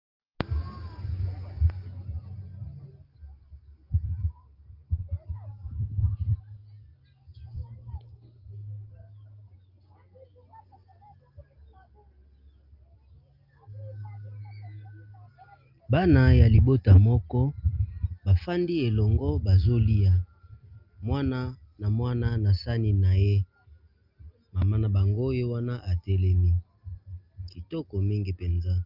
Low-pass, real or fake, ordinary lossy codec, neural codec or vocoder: 5.4 kHz; real; Opus, 24 kbps; none